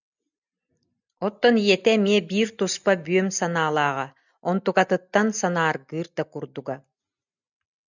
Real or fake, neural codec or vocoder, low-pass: real; none; 7.2 kHz